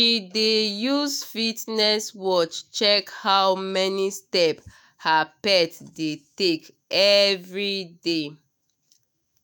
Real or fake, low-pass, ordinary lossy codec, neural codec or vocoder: fake; none; none; autoencoder, 48 kHz, 128 numbers a frame, DAC-VAE, trained on Japanese speech